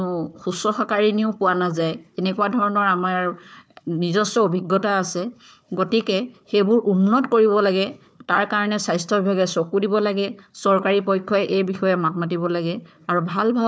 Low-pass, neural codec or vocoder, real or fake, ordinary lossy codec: none; codec, 16 kHz, 4 kbps, FunCodec, trained on Chinese and English, 50 frames a second; fake; none